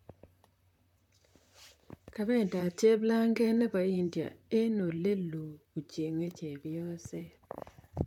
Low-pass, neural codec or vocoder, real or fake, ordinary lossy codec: 19.8 kHz; vocoder, 44.1 kHz, 128 mel bands, Pupu-Vocoder; fake; none